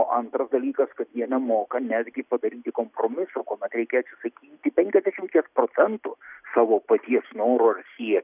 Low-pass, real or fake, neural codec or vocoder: 3.6 kHz; real; none